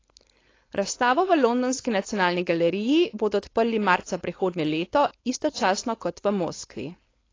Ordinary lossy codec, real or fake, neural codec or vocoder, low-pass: AAC, 32 kbps; fake; codec, 16 kHz, 4.8 kbps, FACodec; 7.2 kHz